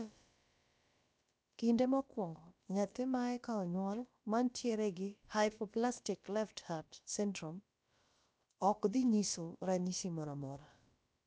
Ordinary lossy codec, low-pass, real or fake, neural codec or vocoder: none; none; fake; codec, 16 kHz, about 1 kbps, DyCAST, with the encoder's durations